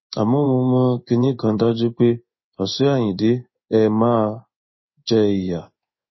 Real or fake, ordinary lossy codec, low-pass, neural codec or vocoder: fake; MP3, 24 kbps; 7.2 kHz; codec, 16 kHz in and 24 kHz out, 1 kbps, XY-Tokenizer